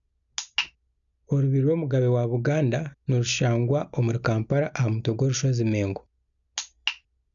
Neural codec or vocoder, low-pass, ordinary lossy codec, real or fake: none; 7.2 kHz; none; real